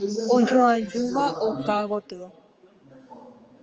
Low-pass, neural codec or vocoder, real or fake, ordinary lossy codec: 7.2 kHz; codec, 16 kHz, 4 kbps, X-Codec, HuBERT features, trained on general audio; fake; Opus, 24 kbps